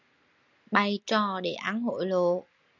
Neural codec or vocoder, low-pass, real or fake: none; 7.2 kHz; real